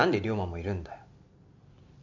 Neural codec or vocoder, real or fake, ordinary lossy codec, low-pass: none; real; none; 7.2 kHz